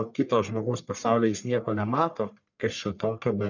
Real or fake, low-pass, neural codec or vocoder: fake; 7.2 kHz; codec, 44.1 kHz, 1.7 kbps, Pupu-Codec